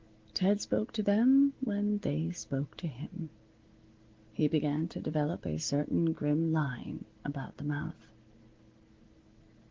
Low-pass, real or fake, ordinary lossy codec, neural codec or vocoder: 7.2 kHz; real; Opus, 16 kbps; none